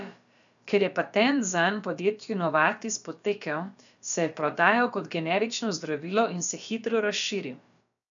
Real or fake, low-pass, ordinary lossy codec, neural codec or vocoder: fake; 7.2 kHz; none; codec, 16 kHz, about 1 kbps, DyCAST, with the encoder's durations